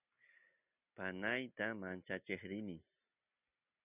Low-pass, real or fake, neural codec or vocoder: 3.6 kHz; real; none